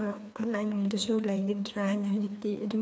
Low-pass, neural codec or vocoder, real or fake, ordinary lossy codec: none; codec, 16 kHz, 2 kbps, FreqCodec, larger model; fake; none